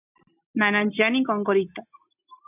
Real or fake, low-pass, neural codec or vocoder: real; 3.6 kHz; none